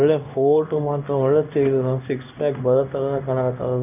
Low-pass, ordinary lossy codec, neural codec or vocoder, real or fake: 3.6 kHz; none; codec, 16 kHz in and 24 kHz out, 1 kbps, XY-Tokenizer; fake